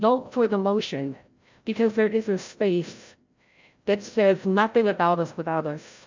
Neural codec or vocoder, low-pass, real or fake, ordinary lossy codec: codec, 16 kHz, 0.5 kbps, FreqCodec, larger model; 7.2 kHz; fake; MP3, 64 kbps